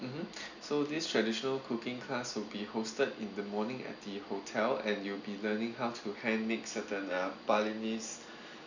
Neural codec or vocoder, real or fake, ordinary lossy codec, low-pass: none; real; none; 7.2 kHz